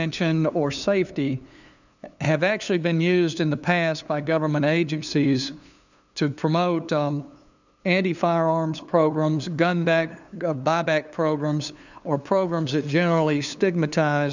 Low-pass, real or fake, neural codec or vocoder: 7.2 kHz; fake; codec, 16 kHz, 2 kbps, FunCodec, trained on LibriTTS, 25 frames a second